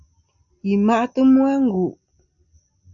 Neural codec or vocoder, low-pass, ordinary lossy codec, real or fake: none; 7.2 kHz; MP3, 96 kbps; real